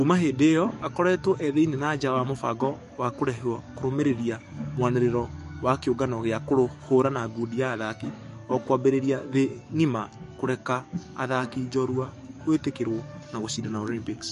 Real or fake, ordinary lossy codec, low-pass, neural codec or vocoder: fake; MP3, 48 kbps; 14.4 kHz; codec, 44.1 kHz, 7.8 kbps, DAC